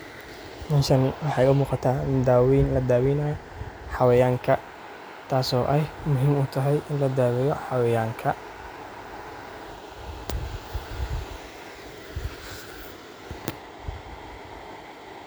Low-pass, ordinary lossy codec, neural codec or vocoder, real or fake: none; none; none; real